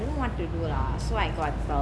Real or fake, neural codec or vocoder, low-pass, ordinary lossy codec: real; none; none; none